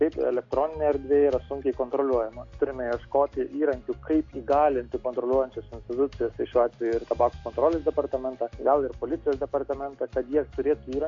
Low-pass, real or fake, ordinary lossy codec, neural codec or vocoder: 7.2 kHz; real; AAC, 48 kbps; none